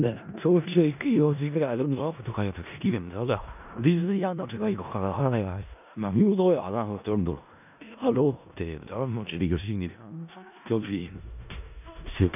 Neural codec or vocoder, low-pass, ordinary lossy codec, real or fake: codec, 16 kHz in and 24 kHz out, 0.4 kbps, LongCat-Audio-Codec, four codebook decoder; 3.6 kHz; none; fake